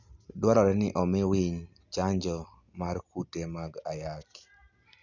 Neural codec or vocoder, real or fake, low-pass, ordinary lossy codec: none; real; 7.2 kHz; none